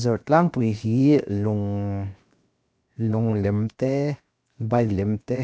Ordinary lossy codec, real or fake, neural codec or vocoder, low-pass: none; fake; codec, 16 kHz, 0.7 kbps, FocalCodec; none